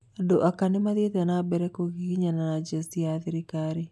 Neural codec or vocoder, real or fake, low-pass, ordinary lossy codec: none; real; none; none